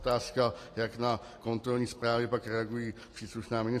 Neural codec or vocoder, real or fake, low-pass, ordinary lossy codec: none; real; 14.4 kHz; AAC, 48 kbps